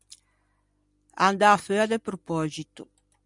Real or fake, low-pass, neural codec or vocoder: real; 10.8 kHz; none